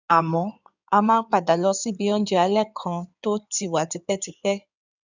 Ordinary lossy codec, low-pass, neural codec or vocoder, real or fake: none; 7.2 kHz; codec, 16 kHz in and 24 kHz out, 2.2 kbps, FireRedTTS-2 codec; fake